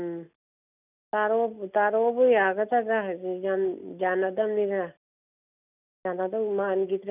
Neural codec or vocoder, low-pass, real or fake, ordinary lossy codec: none; 3.6 kHz; real; none